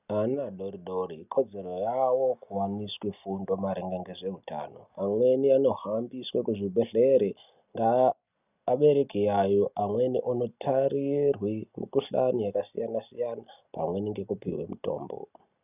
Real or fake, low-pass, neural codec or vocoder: real; 3.6 kHz; none